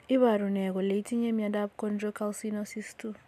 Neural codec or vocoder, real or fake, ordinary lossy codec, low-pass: none; real; none; 14.4 kHz